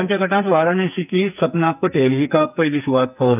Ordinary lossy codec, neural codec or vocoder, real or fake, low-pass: none; codec, 44.1 kHz, 2.6 kbps, SNAC; fake; 3.6 kHz